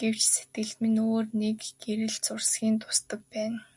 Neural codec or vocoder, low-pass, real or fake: none; 10.8 kHz; real